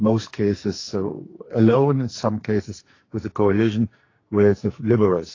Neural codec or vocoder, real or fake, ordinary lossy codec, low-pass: codec, 44.1 kHz, 2.6 kbps, SNAC; fake; AAC, 32 kbps; 7.2 kHz